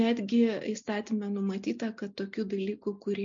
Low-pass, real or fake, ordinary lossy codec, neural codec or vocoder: 7.2 kHz; real; MP3, 64 kbps; none